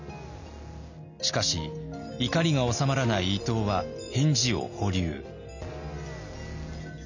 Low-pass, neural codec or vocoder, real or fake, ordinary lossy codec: 7.2 kHz; none; real; none